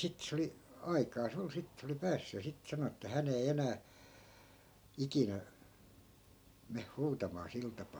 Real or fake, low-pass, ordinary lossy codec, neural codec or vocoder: real; none; none; none